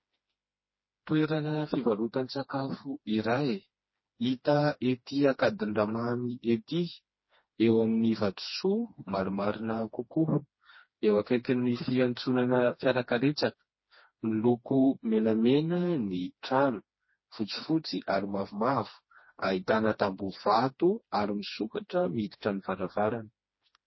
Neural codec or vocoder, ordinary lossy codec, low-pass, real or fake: codec, 16 kHz, 2 kbps, FreqCodec, smaller model; MP3, 24 kbps; 7.2 kHz; fake